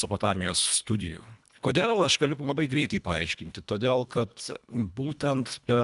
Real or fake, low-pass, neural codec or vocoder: fake; 10.8 kHz; codec, 24 kHz, 1.5 kbps, HILCodec